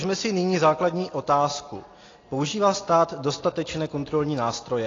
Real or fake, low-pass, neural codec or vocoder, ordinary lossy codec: real; 7.2 kHz; none; AAC, 32 kbps